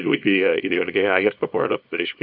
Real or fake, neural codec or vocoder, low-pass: fake; codec, 24 kHz, 0.9 kbps, WavTokenizer, small release; 5.4 kHz